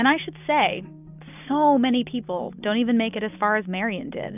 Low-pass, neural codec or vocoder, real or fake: 3.6 kHz; none; real